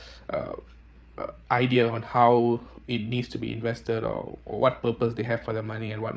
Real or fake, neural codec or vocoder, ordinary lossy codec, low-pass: fake; codec, 16 kHz, 8 kbps, FreqCodec, larger model; none; none